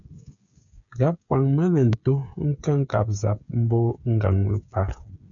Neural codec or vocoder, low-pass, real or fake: codec, 16 kHz, 8 kbps, FreqCodec, smaller model; 7.2 kHz; fake